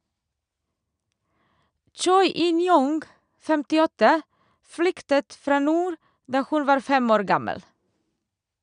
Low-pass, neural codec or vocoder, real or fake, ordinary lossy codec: 9.9 kHz; none; real; none